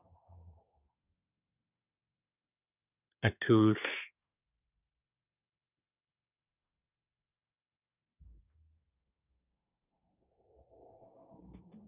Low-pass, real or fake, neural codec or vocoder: 3.6 kHz; fake; codec, 24 kHz, 1 kbps, SNAC